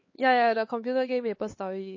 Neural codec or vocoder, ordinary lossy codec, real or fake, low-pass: codec, 16 kHz, 4 kbps, X-Codec, HuBERT features, trained on LibriSpeech; MP3, 32 kbps; fake; 7.2 kHz